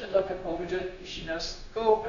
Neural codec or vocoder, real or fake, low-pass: codec, 16 kHz, 0.9 kbps, LongCat-Audio-Codec; fake; 7.2 kHz